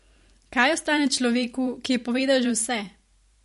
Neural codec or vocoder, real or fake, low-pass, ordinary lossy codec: vocoder, 44.1 kHz, 128 mel bands every 512 samples, BigVGAN v2; fake; 14.4 kHz; MP3, 48 kbps